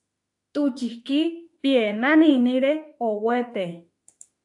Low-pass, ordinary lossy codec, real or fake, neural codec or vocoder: 10.8 kHz; AAC, 64 kbps; fake; autoencoder, 48 kHz, 32 numbers a frame, DAC-VAE, trained on Japanese speech